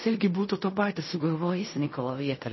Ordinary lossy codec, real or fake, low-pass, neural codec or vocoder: MP3, 24 kbps; fake; 7.2 kHz; codec, 16 kHz in and 24 kHz out, 0.4 kbps, LongCat-Audio-Codec, fine tuned four codebook decoder